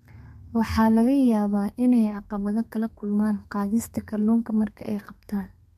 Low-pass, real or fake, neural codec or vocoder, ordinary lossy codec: 14.4 kHz; fake; codec, 32 kHz, 1.9 kbps, SNAC; MP3, 64 kbps